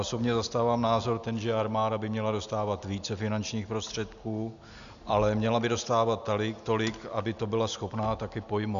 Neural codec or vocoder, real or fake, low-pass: none; real; 7.2 kHz